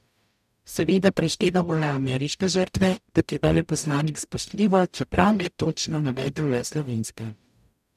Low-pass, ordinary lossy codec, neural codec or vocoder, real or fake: 14.4 kHz; none; codec, 44.1 kHz, 0.9 kbps, DAC; fake